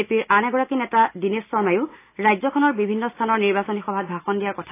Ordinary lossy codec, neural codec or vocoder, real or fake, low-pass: AAC, 24 kbps; none; real; 3.6 kHz